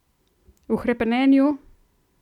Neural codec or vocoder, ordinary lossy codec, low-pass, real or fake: none; none; 19.8 kHz; real